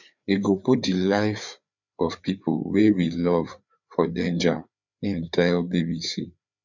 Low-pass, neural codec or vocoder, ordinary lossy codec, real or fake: 7.2 kHz; codec, 16 kHz, 4 kbps, FreqCodec, larger model; none; fake